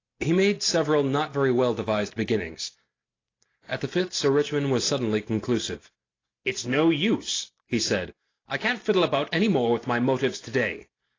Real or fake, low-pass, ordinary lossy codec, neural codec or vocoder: real; 7.2 kHz; AAC, 32 kbps; none